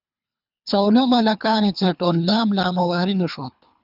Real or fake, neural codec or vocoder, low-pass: fake; codec, 24 kHz, 3 kbps, HILCodec; 5.4 kHz